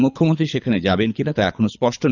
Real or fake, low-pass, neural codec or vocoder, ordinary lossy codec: fake; 7.2 kHz; codec, 24 kHz, 6 kbps, HILCodec; none